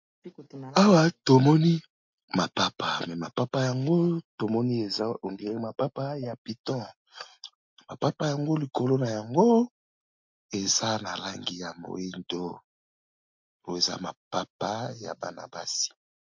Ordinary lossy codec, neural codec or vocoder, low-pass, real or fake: MP3, 48 kbps; none; 7.2 kHz; real